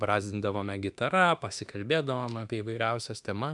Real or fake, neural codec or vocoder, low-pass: fake; autoencoder, 48 kHz, 32 numbers a frame, DAC-VAE, trained on Japanese speech; 10.8 kHz